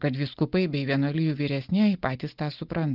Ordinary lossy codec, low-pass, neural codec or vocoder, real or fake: Opus, 32 kbps; 5.4 kHz; none; real